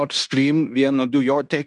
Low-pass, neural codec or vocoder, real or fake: 10.8 kHz; codec, 16 kHz in and 24 kHz out, 0.9 kbps, LongCat-Audio-Codec, fine tuned four codebook decoder; fake